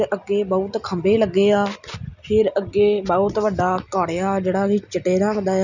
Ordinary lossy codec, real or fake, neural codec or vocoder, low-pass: none; real; none; 7.2 kHz